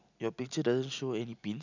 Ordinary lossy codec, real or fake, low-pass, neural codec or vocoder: none; real; 7.2 kHz; none